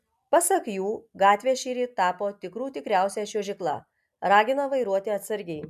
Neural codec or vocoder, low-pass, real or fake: none; 14.4 kHz; real